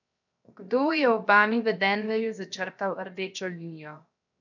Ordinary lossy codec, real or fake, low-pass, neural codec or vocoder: none; fake; 7.2 kHz; codec, 16 kHz, 0.7 kbps, FocalCodec